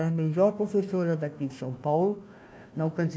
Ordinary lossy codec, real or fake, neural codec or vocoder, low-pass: none; fake; codec, 16 kHz, 1 kbps, FunCodec, trained on Chinese and English, 50 frames a second; none